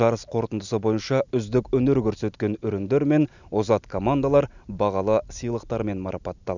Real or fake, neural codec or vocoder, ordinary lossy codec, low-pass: real; none; none; 7.2 kHz